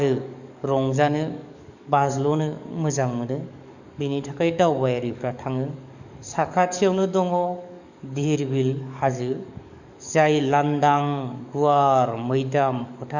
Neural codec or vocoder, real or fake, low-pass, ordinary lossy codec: codec, 44.1 kHz, 7.8 kbps, DAC; fake; 7.2 kHz; none